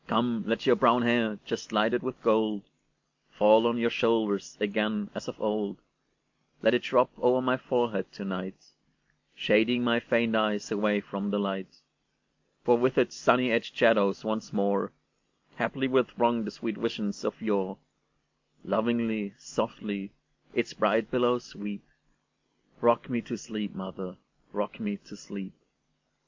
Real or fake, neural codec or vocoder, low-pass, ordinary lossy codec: real; none; 7.2 kHz; MP3, 64 kbps